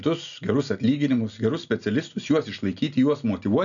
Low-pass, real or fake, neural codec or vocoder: 7.2 kHz; real; none